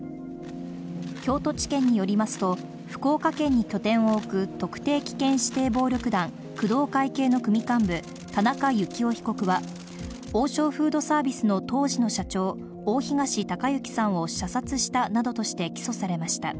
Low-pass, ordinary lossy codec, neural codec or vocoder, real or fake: none; none; none; real